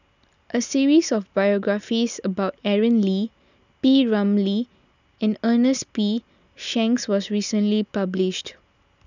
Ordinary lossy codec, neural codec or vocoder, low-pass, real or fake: none; none; 7.2 kHz; real